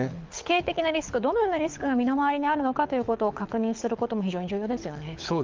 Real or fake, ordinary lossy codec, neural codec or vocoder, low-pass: fake; Opus, 24 kbps; codec, 24 kHz, 6 kbps, HILCodec; 7.2 kHz